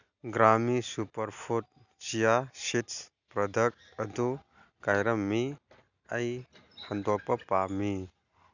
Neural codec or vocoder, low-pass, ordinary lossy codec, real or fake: none; 7.2 kHz; none; real